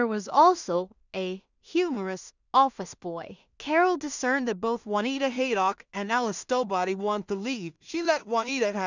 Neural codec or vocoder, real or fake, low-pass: codec, 16 kHz in and 24 kHz out, 0.4 kbps, LongCat-Audio-Codec, two codebook decoder; fake; 7.2 kHz